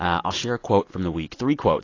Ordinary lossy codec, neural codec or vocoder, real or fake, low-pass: AAC, 32 kbps; none; real; 7.2 kHz